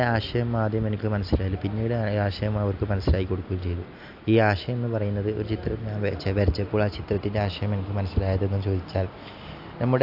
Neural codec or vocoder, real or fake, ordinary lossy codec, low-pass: none; real; AAC, 48 kbps; 5.4 kHz